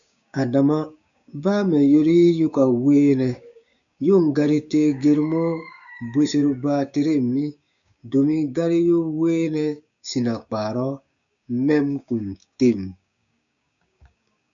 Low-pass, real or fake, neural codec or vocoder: 7.2 kHz; fake; codec, 16 kHz, 6 kbps, DAC